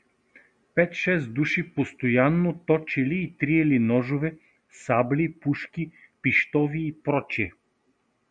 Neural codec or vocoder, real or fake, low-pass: none; real; 9.9 kHz